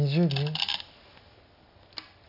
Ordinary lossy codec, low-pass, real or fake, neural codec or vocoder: none; 5.4 kHz; real; none